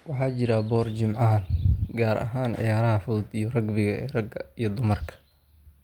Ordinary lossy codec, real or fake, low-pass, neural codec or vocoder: Opus, 32 kbps; real; 19.8 kHz; none